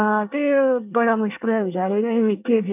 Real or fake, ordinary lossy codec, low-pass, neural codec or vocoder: fake; none; 3.6 kHz; codec, 24 kHz, 1 kbps, SNAC